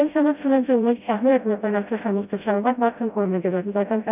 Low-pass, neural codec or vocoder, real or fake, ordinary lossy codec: 3.6 kHz; codec, 16 kHz, 0.5 kbps, FreqCodec, smaller model; fake; none